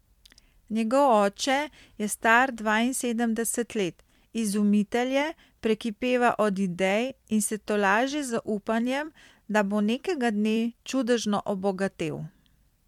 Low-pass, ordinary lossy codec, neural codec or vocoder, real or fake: 19.8 kHz; MP3, 96 kbps; vocoder, 44.1 kHz, 128 mel bands every 256 samples, BigVGAN v2; fake